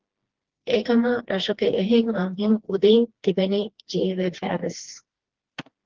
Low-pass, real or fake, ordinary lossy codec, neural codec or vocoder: 7.2 kHz; fake; Opus, 16 kbps; codec, 16 kHz, 2 kbps, FreqCodec, smaller model